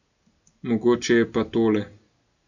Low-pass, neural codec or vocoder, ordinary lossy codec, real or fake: 7.2 kHz; none; none; real